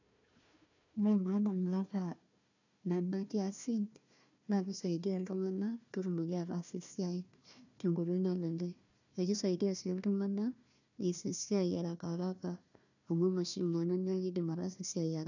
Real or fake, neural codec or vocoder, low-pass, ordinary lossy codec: fake; codec, 16 kHz, 1 kbps, FunCodec, trained on Chinese and English, 50 frames a second; 7.2 kHz; none